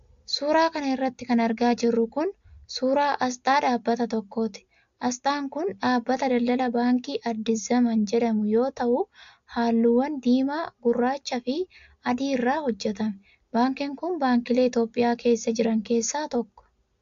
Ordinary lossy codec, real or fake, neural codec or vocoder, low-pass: AAC, 64 kbps; real; none; 7.2 kHz